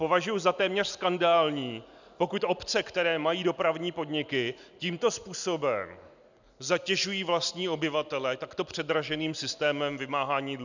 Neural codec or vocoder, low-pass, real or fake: none; 7.2 kHz; real